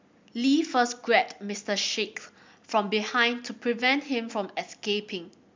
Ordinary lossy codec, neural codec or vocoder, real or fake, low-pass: MP3, 64 kbps; none; real; 7.2 kHz